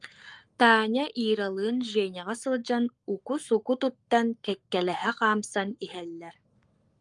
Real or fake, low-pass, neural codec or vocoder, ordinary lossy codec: fake; 10.8 kHz; codec, 44.1 kHz, 7.8 kbps, Pupu-Codec; Opus, 32 kbps